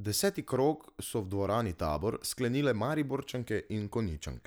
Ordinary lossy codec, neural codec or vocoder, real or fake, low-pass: none; none; real; none